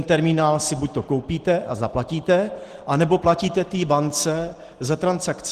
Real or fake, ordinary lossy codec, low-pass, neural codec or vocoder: real; Opus, 16 kbps; 14.4 kHz; none